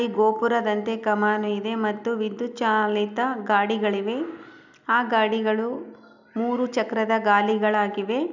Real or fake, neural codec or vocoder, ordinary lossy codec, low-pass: real; none; none; 7.2 kHz